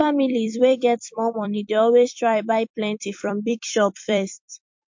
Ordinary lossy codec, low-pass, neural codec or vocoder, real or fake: MP3, 48 kbps; 7.2 kHz; vocoder, 44.1 kHz, 128 mel bands every 256 samples, BigVGAN v2; fake